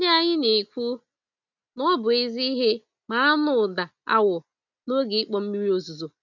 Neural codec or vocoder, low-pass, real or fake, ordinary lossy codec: none; 7.2 kHz; real; none